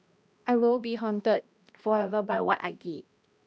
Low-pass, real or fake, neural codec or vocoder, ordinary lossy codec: none; fake; codec, 16 kHz, 1 kbps, X-Codec, HuBERT features, trained on balanced general audio; none